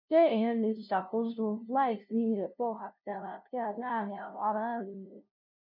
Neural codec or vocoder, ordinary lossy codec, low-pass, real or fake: codec, 16 kHz, 0.5 kbps, FunCodec, trained on LibriTTS, 25 frames a second; none; 5.4 kHz; fake